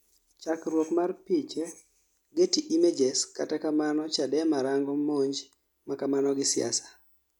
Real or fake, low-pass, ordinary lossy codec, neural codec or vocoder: real; 19.8 kHz; none; none